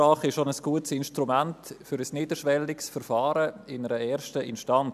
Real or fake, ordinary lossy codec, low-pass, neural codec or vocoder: real; none; 14.4 kHz; none